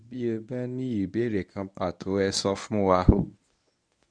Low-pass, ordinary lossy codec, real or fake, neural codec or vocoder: 9.9 kHz; none; fake; codec, 24 kHz, 0.9 kbps, WavTokenizer, medium speech release version 1